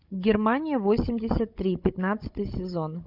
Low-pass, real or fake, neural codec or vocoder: 5.4 kHz; fake; codec, 16 kHz, 16 kbps, FunCodec, trained on Chinese and English, 50 frames a second